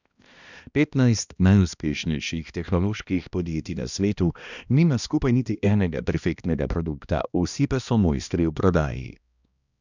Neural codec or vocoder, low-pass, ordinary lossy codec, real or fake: codec, 16 kHz, 1 kbps, X-Codec, HuBERT features, trained on balanced general audio; 7.2 kHz; none; fake